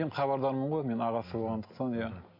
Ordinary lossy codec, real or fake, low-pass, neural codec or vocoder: MP3, 32 kbps; real; 5.4 kHz; none